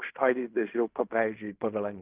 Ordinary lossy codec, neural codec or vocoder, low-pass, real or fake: Opus, 24 kbps; codec, 16 kHz in and 24 kHz out, 0.4 kbps, LongCat-Audio-Codec, fine tuned four codebook decoder; 3.6 kHz; fake